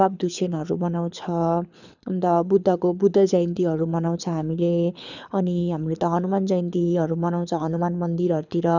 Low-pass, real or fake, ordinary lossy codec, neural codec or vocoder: 7.2 kHz; fake; none; codec, 24 kHz, 6 kbps, HILCodec